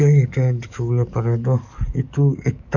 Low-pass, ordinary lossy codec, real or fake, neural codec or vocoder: 7.2 kHz; none; fake; codec, 44.1 kHz, 7.8 kbps, DAC